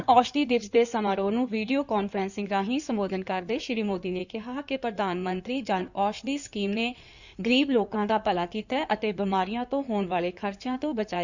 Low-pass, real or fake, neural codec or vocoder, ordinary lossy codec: 7.2 kHz; fake; codec, 16 kHz in and 24 kHz out, 2.2 kbps, FireRedTTS-2 codec; none